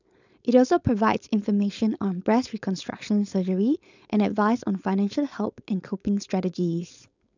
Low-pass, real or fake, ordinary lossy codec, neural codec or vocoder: 7.2 kHz; fake; none; codec, 16 kHz, 4.8 kbps, FACodec